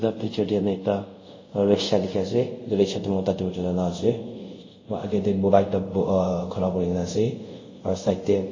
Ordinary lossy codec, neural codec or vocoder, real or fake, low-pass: MP3, 32 kbps; codec, 24 kHz, 0.5 kbps, DualCodec; fake; 7.2 kHz